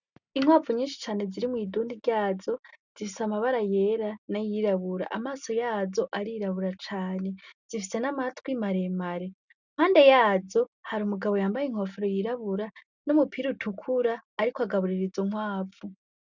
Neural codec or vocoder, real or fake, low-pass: none; real; 7.2 kHz